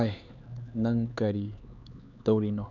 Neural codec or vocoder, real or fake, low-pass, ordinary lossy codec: codec, 16 kHz, 4 kbps, X-Codec, HuBERT features, trained on LibriSpeech; fake; 7.2 kHz; none